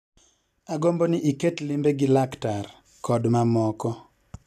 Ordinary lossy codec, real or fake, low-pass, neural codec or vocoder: none; real; 14.4 kHz; none